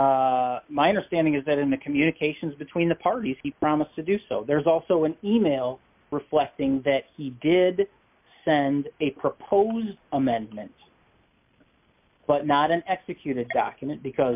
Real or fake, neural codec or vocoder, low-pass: real; none; 3.6 kHz